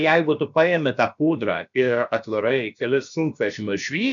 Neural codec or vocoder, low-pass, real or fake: codec, 16 kHz, about 1 kbps, DyCAST, with the encoder's durations; 7.2 kHz; fake